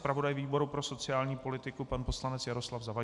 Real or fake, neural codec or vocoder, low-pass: real; none; 10.8 kHz